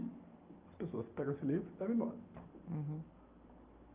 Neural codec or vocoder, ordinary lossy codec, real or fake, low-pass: none; Opus, 32 kbps; real; 3.6 kHz